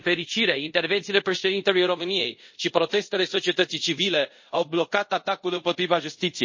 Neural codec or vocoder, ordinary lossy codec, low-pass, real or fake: codec, 24 kHz, 0.5 kbps, DualCodec; MP3, 32 kbps; 7.2 kHz; fake